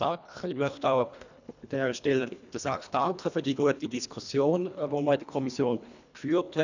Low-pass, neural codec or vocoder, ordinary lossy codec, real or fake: 7.2 kHz; codec, 24 kHz, 1.5 kbps, HILCodec; none; fake